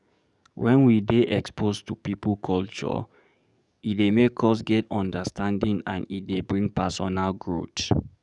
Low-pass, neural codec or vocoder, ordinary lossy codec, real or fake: 10.8 kHz; codec, 44.1 kHz, 7.8 kbps, DAC; none; fake